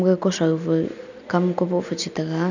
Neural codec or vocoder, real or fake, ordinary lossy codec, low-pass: none; real; none; 7.2 kHz